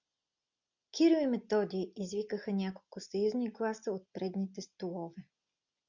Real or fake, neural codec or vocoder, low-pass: real; none; 7.2 kHz